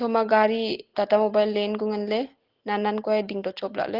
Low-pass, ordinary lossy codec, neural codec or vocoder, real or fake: 5.4 kHz; Opus, 16 kbps; none; real